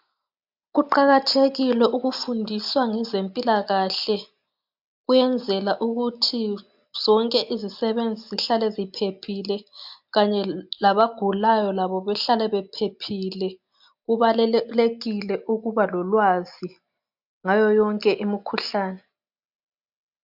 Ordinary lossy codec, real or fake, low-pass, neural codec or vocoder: MP3, 48 kbps; real; 5.4 kHz; none